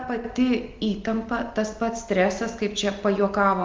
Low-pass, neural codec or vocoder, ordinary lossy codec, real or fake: 7.2 kHz; none; Opus, 24 kbps; real